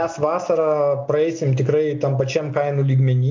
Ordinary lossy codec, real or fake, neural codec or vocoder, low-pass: MP3, 64 kbps; real; none; 7.2 kHz